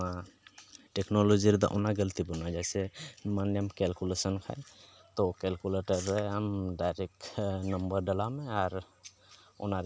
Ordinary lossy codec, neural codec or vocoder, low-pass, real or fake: none; none; none; real